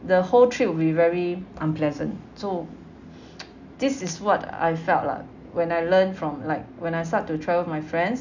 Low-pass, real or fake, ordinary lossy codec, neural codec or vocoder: 7.2 kHz; real; none; none